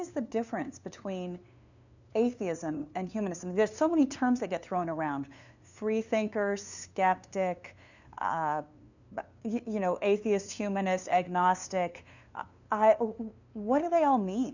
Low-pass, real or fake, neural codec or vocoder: 7.2 kHz; fake; codec, 16 kHz, 2 kbps, FunCodec, trained on LibriTTS, 25 frames a second